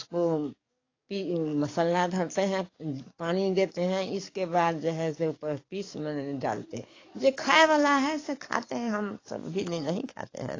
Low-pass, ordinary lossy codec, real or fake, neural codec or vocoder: 7.2 kHz; AAC, 32 kbps; fake; codec, 44.1 kHz, 7.8 kbps, DAC